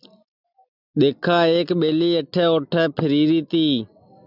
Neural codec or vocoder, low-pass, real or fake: none; 5.4 kHz; real